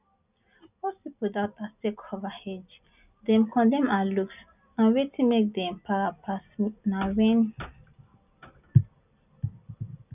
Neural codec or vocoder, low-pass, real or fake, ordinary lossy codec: none; 3.6 kHz; real; none